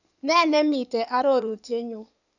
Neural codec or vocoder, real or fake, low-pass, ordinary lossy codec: codec, 16 kHz in and 24 kHz out, 2.2 kbps, FireRedTTS-2 codec; fake; 7.2 kHz; none